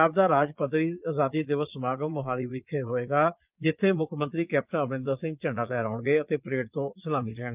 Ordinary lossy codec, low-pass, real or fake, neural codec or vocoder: Opus, 24 kbps; 3.6 kHz; fake; vocoder, 22.05 kHz, 80 mel bands, Vocos